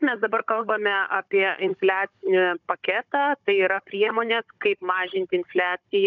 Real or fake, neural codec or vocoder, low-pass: fake; codec, 16 kHz, 16 kbps, FunCodec, trained on Chinese and English, 50 frames a second; 7.2 kHz